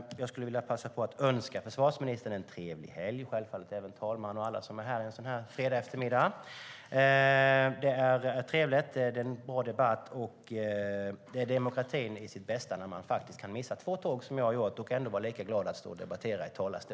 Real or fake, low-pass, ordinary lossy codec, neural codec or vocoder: real; none; none; none